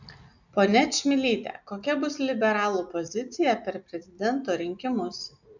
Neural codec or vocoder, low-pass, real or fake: none; 7.2 kHz; real